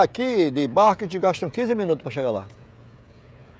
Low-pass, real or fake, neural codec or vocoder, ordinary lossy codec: none; fake; codec, 16 kHz, 16 kbps, FreqCodec, smaller model; none